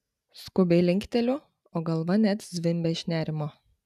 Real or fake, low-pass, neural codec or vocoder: real; 14.4 kHz; none